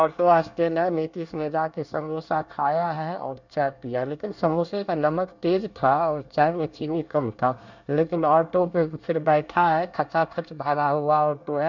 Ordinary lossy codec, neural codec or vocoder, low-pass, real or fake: none; codec, 24 kHz, 1 kbps, SNAC; 7.2 kHz; fake